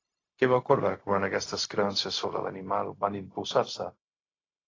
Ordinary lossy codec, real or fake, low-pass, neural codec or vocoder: AAC, 32 kbps; fake; 7.2 kHz; codec, 16 kHz, 0.4 kbps, LongCat-Audio-Codec